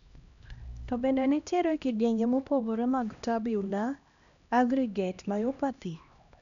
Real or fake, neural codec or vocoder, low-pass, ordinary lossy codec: fake; codec, 16 kHz, 1 kbps, X-Codec, HuBERT features, trained on LibriSpeech; 7.2 kHz; none